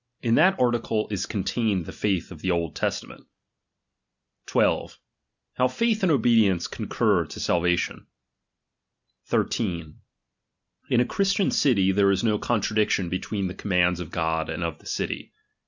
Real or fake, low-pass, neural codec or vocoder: real; 7.2 kHz; none